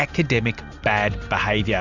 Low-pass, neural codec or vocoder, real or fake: 7.2 kHz; none; real